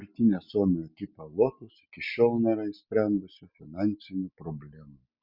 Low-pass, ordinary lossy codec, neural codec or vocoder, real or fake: 5.4 kHz; Opus, 64 kbps; none; real